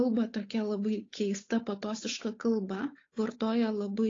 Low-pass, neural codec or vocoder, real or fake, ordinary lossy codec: 7.2 kHz; codec, 16 kHz, 8 kbps, FunCodec, trained on Chinese and English, 25 frames a second; fake; AAC, 32 kbps